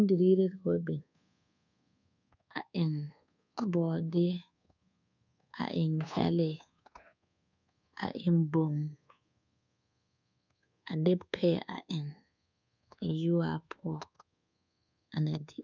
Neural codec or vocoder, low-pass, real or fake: codec, 24 kHz, 1.2 kbps, DualCodec; 7.2 kHz; fake